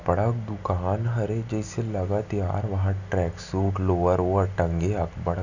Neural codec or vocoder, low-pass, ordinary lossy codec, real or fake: none; 7.2 kHz; none; real